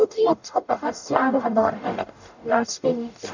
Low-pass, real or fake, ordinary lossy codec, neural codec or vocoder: 7.2 kHz; fake; none; codec, 44.1 kHz, 0.9 kbps, DAC